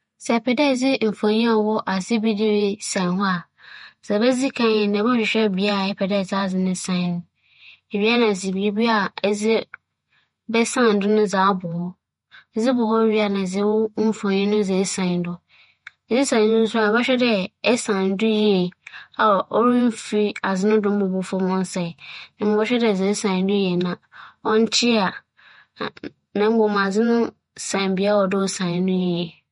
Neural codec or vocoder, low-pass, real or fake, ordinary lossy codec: vocoder, 48 kHz, 128 mel bands, Vocos; 19.8 kHz; fake; MP3, 48 kbps